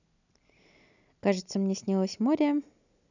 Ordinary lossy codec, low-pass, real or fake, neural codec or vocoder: none; 7.2 kHz; real; none